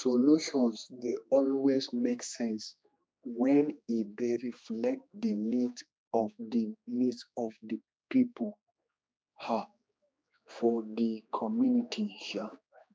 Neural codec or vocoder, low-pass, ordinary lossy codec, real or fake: codec, 16 kHz, 2 kbps, X-Codec, HuBERT features, trained on general audio; none; none; fake